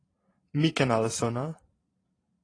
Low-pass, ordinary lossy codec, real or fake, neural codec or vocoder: 9.9 kHz; AAC, 32 kbps; fake; vocoder, 44.1 kHz, 128 mel bands every 512 samples, BigVGAN v2